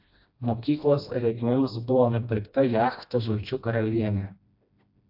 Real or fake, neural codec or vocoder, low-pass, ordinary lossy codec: fake; codec, 16 kHz, 1 kbps, FreqCodec, smaller model; 5.4 kHz; AAC, 48 kbps